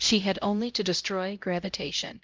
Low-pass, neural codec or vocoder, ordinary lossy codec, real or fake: 7.2 kHz; codec, 16 kHz, 0.5 kbps, X-Codec, HuBERT features, trained on LibriSpeech; Opus, 32 kbps; fake